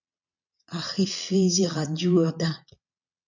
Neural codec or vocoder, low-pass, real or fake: vocoder, 22.05 kHz, 80 mel bands, Vocos; 7.2 kHz; fake